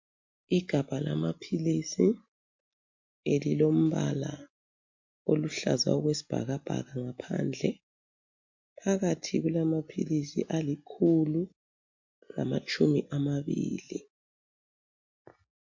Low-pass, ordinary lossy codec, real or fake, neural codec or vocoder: 7.2 kHz; MP3, 48 kbps; real; none